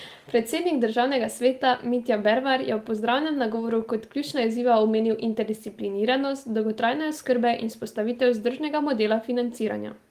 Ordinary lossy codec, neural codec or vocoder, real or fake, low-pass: Opus, 24 kbps; none; real; 14.4 kHz